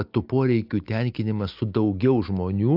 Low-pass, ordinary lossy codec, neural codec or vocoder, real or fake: 5.4 kHz; AAC, 48 kbps; none; real